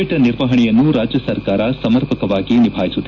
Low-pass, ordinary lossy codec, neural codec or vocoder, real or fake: 7.2 kHz; none; none; real